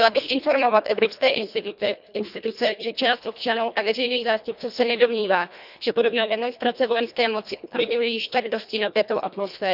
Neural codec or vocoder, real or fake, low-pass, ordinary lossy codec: codec, 24 kHz, 1.5 kbps, HILCodec; fake; 5.4 kHz; none